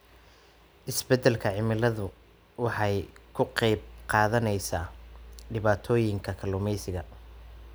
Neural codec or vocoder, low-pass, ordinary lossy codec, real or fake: none; none; none; real